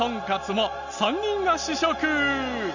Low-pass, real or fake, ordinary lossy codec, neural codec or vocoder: 7.2 kHz; real; none; none